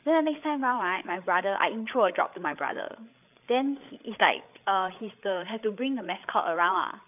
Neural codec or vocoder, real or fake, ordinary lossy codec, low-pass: codec, 16 kHz, 8 kbps, FreqCodec, larger model; fake; none; 3.6 kHz